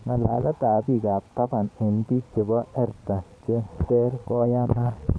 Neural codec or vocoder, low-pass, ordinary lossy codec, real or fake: vocoder, 22.05 kHz, 80 mel bands, Vocos; none; none; fake